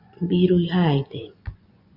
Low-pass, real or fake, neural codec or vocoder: 5.4 kHz; fake; vocoder, 24 kHz, 100 mel bands, Vocos